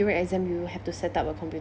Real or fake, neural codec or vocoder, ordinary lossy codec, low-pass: real; none; none; none